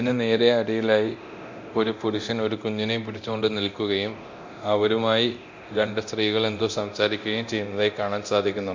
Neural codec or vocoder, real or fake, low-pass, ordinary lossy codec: codec, 24 kHz, 0.9 kbps, DualCodec; fake; 7.2 kHz; MP3, 48 kbps